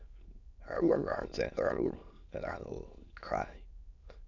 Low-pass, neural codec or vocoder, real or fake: 7.2 kHz; autoencoder, 22.05 kHz, a latent of 192 numbers a frame, VITS, trained on many speakers; fake